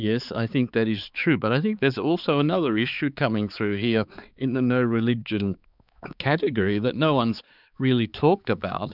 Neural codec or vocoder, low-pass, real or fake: codec, 16 kHz, 4 kbps, X-Codec, HuBERT features, trained on balanced general audio; 5.4 kHz; fake